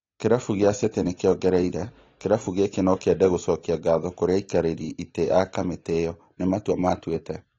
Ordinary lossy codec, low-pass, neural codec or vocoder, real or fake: AAC, 32 kbps; 7.2 kHz; none; real